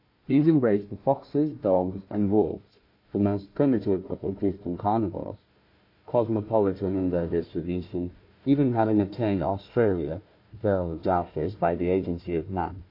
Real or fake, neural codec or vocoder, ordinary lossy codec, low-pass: fake; codec, 16 kHz, 1 kbps, FunCodec, trained on Chinese and English, 50 frames a second; MP3, 32 kbps; 5.4 kHz